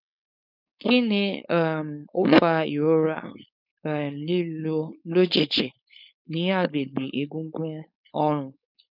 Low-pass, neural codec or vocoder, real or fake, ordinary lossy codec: 5.4 kHz; codec, 16 kHz, 4.8 kbps, FACodec; fake; none